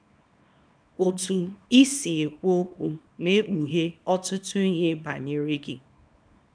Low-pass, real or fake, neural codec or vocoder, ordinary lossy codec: 9.9 kHz; fake; codec, 24 kHz, 0.9 kbps, WavTokenizer, small release; none